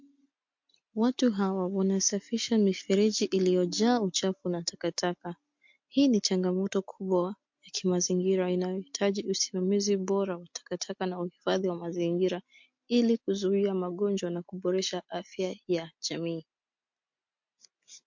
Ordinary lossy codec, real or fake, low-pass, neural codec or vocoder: MP3, 48 kbps; real; 7.2 kHz; none